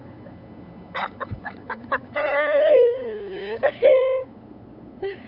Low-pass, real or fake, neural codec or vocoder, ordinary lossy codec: 5.4 kHz; fake; codec, 16 kHz, 8 kbps, FunCodec, trained on LibriTTS, 25 frames a second; none